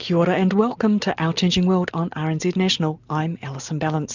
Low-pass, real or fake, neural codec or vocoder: 7.2 kHz; real; none